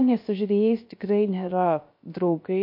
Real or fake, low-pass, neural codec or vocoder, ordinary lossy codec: fake; 5.4 kHz; codec, 16 kHz, 0.3 kbps, FocalCodec; MP3, 32 kbps